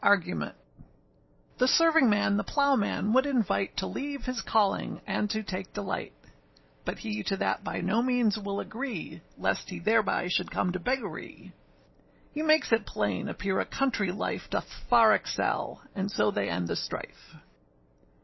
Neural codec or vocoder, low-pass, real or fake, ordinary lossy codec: none; 7.2 kHz; real; MP3, 24 kbps